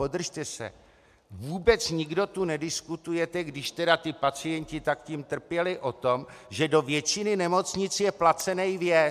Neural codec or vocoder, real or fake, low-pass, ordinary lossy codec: none; real; 14.4 kHz; MP3, 96 kbps